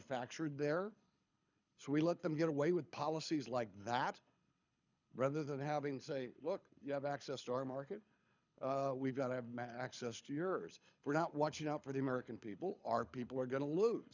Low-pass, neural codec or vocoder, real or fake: 7.2 kHz; codec, 24 kHz, 6 kbps, HILCodec; fake